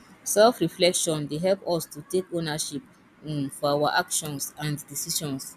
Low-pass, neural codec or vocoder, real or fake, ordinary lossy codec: 14.4 kHz; none; real; none